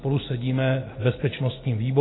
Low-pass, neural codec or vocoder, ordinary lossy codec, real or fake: 7.2 kHz; none; AAC, 16 kbps; real